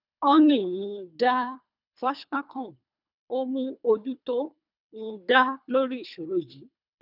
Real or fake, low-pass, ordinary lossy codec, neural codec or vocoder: fake; 5.4 kHz; none; codec, 24 kHz, 3 kbps, HILCodec